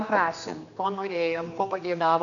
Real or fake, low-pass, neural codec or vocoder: fake; 7.2 kHz; codec, 16 kHz, 2 kbps, X-Codec, HuBERT features, trained on general audio